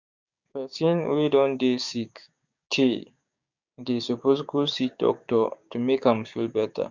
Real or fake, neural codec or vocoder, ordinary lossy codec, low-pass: fake; codec, 16 kHz, 6 kbps, DAC; Opus, 64 kbps; 7.2 kHz